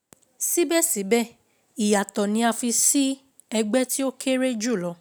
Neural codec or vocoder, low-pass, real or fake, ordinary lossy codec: none; none; real; none